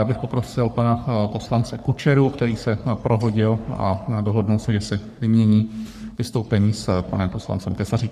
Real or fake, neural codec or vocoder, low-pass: fake; codec, 44.1 kHz, 3.4 kbps, Pupu-Codec; 14.4 kHz